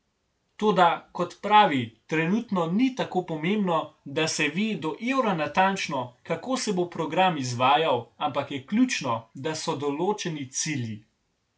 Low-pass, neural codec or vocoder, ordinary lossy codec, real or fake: none; none; none; real